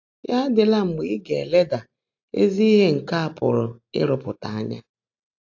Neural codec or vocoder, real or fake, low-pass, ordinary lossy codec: none; real; 7.2 kHz; AAC, 48 kbps